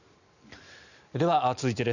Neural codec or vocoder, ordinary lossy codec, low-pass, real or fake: none; none; 7.2 kHz; real